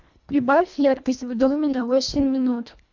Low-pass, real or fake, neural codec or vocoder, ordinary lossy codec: 7.2 kHz; fake; codec, 24 kHz, 1.5 kbps, HILCodec; MP3, 64 kbps